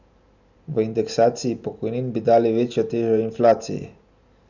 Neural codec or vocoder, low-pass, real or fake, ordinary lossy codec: none; 7.2 kHz; real; none